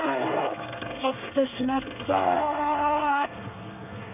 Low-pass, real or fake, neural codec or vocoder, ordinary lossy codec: 3.6 kHz; fake; codec, 24 kHz, 1 kbps, SNAC; none